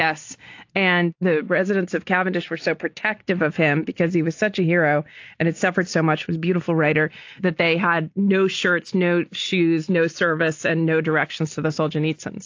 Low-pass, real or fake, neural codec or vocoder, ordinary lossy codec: 7.2 kHz; real; none; AAC, 48 kbps